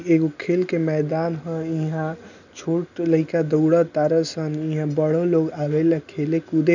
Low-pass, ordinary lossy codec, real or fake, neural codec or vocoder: 7.2 kHz; none; real; none